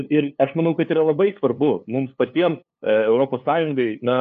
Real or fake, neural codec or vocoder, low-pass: fake; codec, 16 kHz, 2 kbps, FunCodec, trained on LibriTTS, 25 frames a second; 7.2 kHz